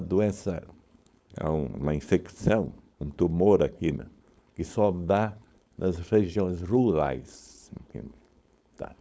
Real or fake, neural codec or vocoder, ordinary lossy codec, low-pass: fake; codec, 16 kHz, 4.8 kbps, FACodec; none; none